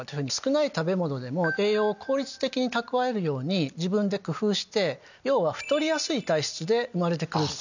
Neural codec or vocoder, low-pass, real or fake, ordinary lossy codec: none; 7.2 kHz; real; none